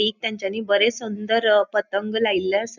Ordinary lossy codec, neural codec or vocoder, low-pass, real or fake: none; none; 7.2 kHz; real